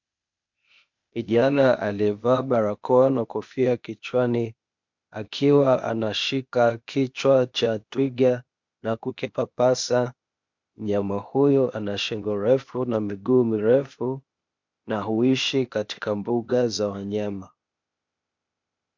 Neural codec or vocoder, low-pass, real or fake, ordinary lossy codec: codec, 16 kHz, 0.8 kbps, ZipCodec; 7.2 kHz; fake; MP3, 64 kbps